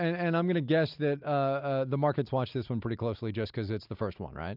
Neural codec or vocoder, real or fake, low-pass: none; real; 5.4 kHz